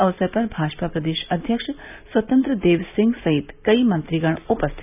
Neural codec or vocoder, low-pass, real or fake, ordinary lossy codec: none; 3.6 kHz; real; none